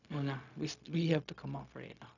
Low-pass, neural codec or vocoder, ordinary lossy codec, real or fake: 7.2 kHz; codec, 16 kHz, 0.4 kbps, LongCat-Audio-Codec; none; fake